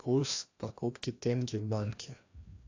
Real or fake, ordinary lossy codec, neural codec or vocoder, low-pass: fake; AAC, 48 kbps; codec, 16 kHz, 1 kbps, FreqCodec, larger model; 7.2 kHz